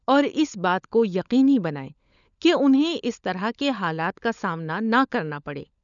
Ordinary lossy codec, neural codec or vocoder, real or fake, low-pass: none; codec, 16 kHz, 16 kbps, FunCodec, trained on LibriTTS, 50 frames a second; fake; 7.2 kHz